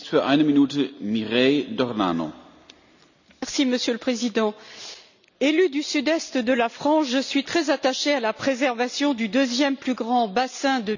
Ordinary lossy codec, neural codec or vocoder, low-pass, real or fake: none; none; 7.2 kHz; real